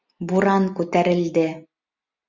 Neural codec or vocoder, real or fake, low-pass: none; real; 7.2 kHz